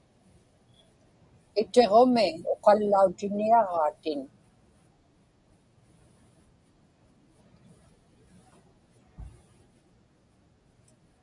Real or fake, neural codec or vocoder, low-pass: real; none; 10.8 kHz